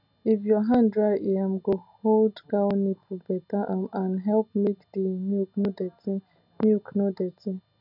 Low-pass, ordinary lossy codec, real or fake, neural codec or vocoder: 5.4 kHz; none; real; none